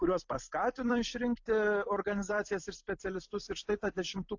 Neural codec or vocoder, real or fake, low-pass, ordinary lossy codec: vocoder, 44.1 kHz, 128 mel bands every 256 samples, BigVGAN v2; fake; 7.2 kHz; AAC, 48 kbps